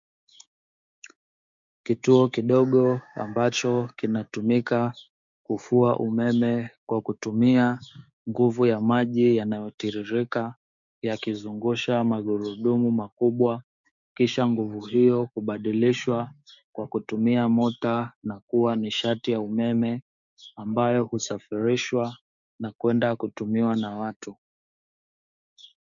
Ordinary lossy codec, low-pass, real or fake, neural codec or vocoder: MP3, 64 kbps; 7.2 kHz; fake; codec, 16 kHz, 6 kbps, DAC